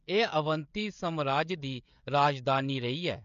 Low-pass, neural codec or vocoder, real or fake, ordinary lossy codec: 7.2 kHz; codec, 16 kHz, 16 kbps, FreqCodec, smaller model; fake; MP3, 64 kbps